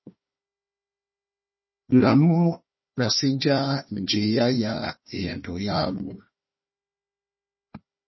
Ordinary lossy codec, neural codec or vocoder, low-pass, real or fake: MP3, 24 kbps; codec, 16 kHz, 1 kbps, FunCodec, trained on Chinese and English, 50 frames a second; 7.2 kHz; fake